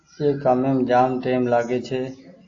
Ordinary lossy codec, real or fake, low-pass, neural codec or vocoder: AAC, 48 kbps; real; 7.2 kHz; none